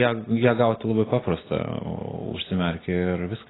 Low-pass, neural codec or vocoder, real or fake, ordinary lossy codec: 7.2 kHz; none; real; AAC, 16 kbps